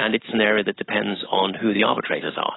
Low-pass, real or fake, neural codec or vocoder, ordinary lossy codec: 7.2 kHz; real; none; AAC, 16 kbps